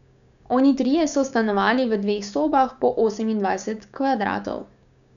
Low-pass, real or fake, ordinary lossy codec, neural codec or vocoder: 7.2 kHz; fake; none; codec, 16 kHz, 6 kbps, DAC